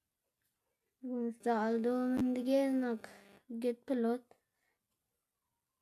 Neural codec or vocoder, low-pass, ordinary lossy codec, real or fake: none; 14.4 kHz; AAC, 96 kbps; real